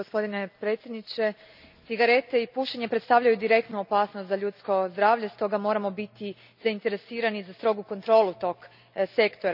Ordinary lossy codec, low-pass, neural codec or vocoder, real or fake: none; 5.4 kHz; none; real